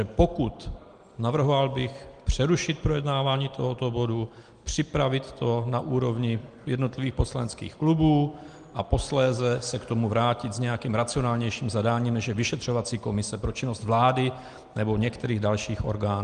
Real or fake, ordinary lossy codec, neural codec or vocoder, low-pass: real; Opus, 24 kbps; none; 9.9 kHz